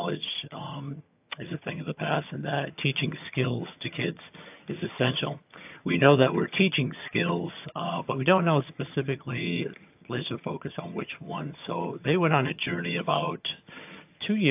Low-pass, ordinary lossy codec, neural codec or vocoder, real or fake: 3.6 kHz; AAC, 32 kbps; vocoder, 22.05 kHz, 80 mel bands, HiFi-GAN; fake